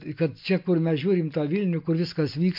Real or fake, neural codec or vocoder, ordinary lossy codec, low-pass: real; none; MP3, 48 kbps; 5.4 kHz